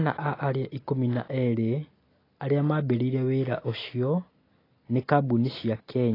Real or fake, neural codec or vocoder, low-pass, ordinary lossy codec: real; none; 5.4 kHz; AAC, 24 kbps